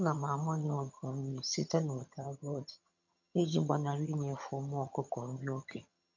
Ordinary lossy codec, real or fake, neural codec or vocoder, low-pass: none; fake; vocoder, 22.05 kHz, 80 mel bands, HiFi-GAN; 7.2 kHz